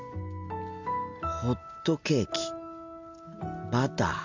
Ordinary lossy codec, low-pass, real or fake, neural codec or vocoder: MP3, 64 kbps; 7.2 kHz; real; none